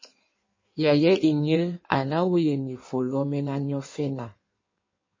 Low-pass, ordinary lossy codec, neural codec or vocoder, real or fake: 7.2 kHz; MP3, 32 kbps; codec, 16 kHz in and 24 kHz out, 1.1 kbps, FireRedTTS-2 codec; fake